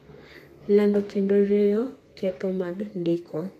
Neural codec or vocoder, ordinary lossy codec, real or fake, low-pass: codec, 32 kHz, 1.9 kbps, SNAC; MP3, 64 kbps; fake; 14.4 kHz